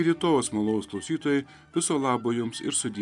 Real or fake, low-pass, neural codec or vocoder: real; 10.8 kHz; none